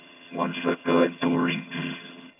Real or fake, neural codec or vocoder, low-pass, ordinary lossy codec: fake; vocoder, 22.05 kHz, 80 mel bands, HiFi-GAN; 3.6 kHz; none